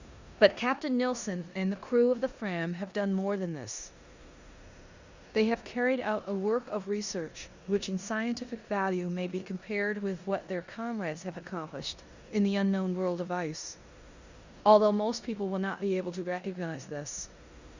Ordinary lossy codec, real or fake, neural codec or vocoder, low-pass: Opus, 64 kbps; fake; codec, 16 kHz in and 24 kHz out, 0.9 kbps, LongCat-Audio-Codec, four codebook decoder; 7.2 kHz